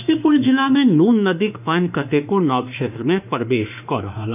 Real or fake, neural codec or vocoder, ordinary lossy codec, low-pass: fake; autoencoder, 48 kHz, 32 numbers a frame, DAC-VAE, trained on Japanese speech; none; 3.6 kHz